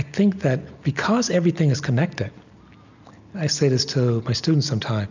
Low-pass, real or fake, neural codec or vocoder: 7.2 kHz; real; none